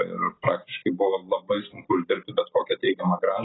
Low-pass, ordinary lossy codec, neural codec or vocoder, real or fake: 7.2 kHz; AAC, 16 kbps; none; real